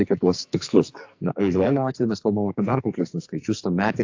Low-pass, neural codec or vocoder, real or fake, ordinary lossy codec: 7.2 kHz; codec, 44.1 kHz, 2.6 kbps, SNAC; fake; AAC, 48 kbps